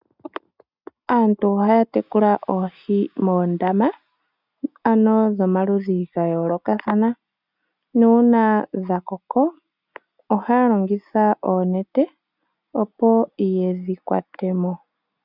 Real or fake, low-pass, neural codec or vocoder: real; 5.4 kHz; none